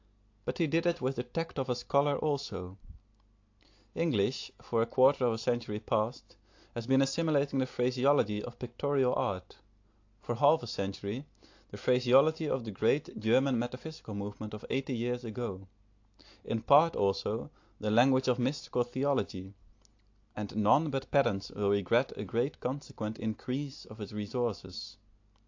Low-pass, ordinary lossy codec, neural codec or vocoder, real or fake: 7.2 kHz; AAC, 48 kbps; none; real